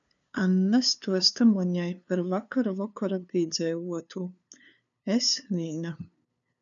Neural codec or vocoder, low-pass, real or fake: codec, 16 kHz, 2 kbps, FunCodec, trained on LibriTTS, 25 frames a second; 7.2 kHz; fake